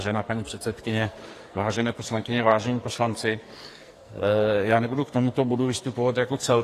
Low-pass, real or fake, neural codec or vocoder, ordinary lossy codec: 14.4 kHz; fake; codec, 44.1 kHz, 2.6 kbps, SNAC; AAC, 48 kbps